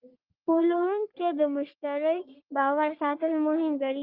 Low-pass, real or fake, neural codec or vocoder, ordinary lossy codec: 5.4 kHz; fake; codec, 44.1 kHz, 3.4 kbps, Pupu-Codec; Opus, 24 kbps